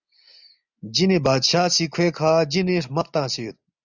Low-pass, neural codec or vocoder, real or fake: 7.2 kHz; none; real